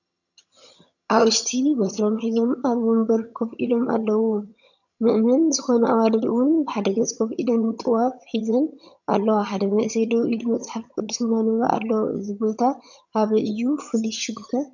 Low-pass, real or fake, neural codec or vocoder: 7.2 kHz; fake; vocoder, 22.05 kHz, 80 mel bands, HiFi-GAN